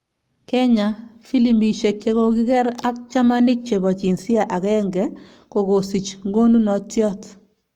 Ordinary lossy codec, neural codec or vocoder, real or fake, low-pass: Opus, 32 kbps; codec, 44.1 kHz, 7.8 kbps, Pupu-Codec; fake; 19.8 kHz